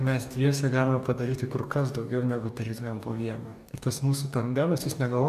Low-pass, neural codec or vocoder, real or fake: 14.4 kHz; codec, 44.1 kHz, 2.6 kbps, DAC; fake